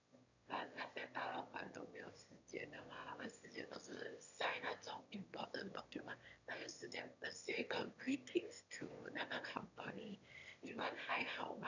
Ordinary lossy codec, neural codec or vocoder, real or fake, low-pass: none; autoencoder, 22.05 kHz, a latent of 192 numbers a frame, VITS, trained on one speaker; fake; 7.2 kHz